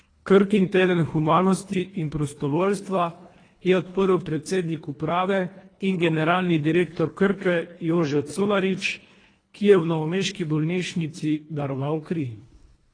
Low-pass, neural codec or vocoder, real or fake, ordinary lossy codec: 9.9 kHz; codec, 24 kHz, 1.5 kbps, HILCodec; fake; AAC, 32 kbps